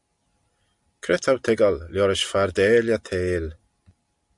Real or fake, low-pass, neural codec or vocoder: real; 10.8 kHz; none